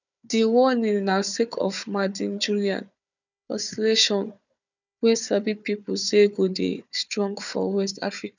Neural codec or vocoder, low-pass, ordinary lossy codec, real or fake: codec, 16 kHz, 4 kbps, FunCodec, trained on Chinese and English, 50 frames a second; 7.2 kHz; none; fake